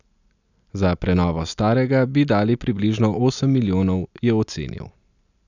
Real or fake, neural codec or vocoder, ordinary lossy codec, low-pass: real; none; none; 7.2 kHz